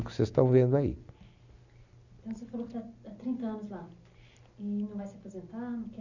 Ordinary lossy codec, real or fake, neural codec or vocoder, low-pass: none; real; none; 7.2 kHz